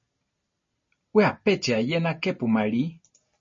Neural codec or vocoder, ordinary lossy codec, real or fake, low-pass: none; MP3, 32 kbps; real; 7.2 kHz